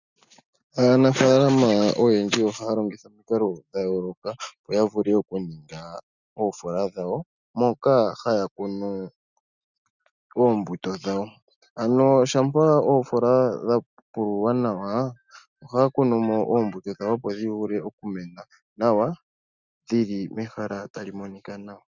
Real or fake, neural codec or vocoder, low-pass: real; none; 7.2 kHz